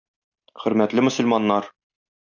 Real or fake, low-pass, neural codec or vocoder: real; 7.2 kHz; none